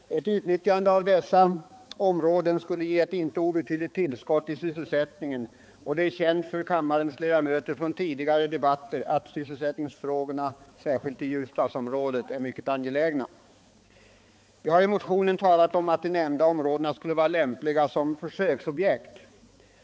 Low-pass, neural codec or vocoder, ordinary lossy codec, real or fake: none; codec, 16 kHz, 4 kbps, X-Codec, HuBERT features, trained on balanced general audio; none; fake